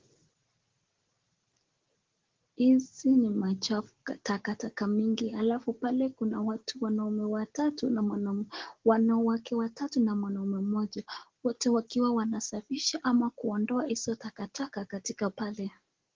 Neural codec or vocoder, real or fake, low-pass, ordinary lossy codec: none; real; 7.2 kHz; Opus, 16 kbps